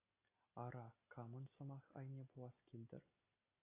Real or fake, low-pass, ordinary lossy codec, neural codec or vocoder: real; 3.6 kHz; AAC, 24 kbps; none